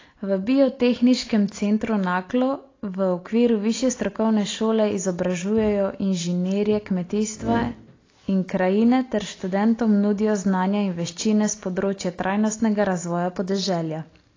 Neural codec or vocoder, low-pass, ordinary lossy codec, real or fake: none; 7.2 kHz; AAC, 32 kbps; real